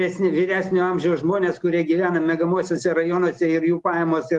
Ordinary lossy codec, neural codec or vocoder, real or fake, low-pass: Opus, 24 kbps; none; real; 10.8 kHz